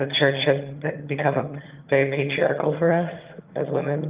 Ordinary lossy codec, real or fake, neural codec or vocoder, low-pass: Opus, 32 kbps; fake; vocoder, 22.05 kHz, 80 mel bands, HiFi-GAN; 3.6 kHz